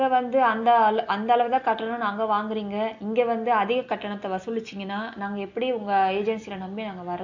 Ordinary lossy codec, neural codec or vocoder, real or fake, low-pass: AAC, 48 kbps; none; real; 7.2 kHz